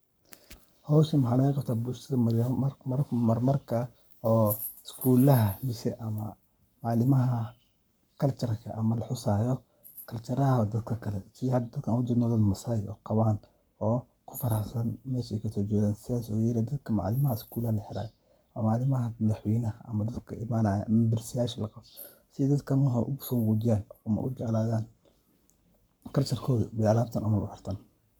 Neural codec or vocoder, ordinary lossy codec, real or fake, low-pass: codec, 44.1 kHz, 7.8 kbps, Pupu-Codec; none; fake; none